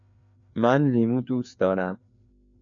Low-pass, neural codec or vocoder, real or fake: 7.2 kHz; codec, 16 kHz, 2 kbps, FreqCodec, larger model; fake